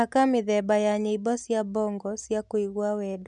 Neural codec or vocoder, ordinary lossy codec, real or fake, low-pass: none; none; real; 10.8 kHz